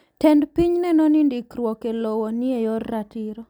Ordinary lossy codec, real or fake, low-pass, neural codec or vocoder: none; real; 19.8 kHz; none